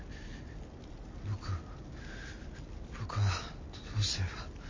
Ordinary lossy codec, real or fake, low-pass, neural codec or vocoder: MP3, 32 kbps; real; 7.2 kHz; none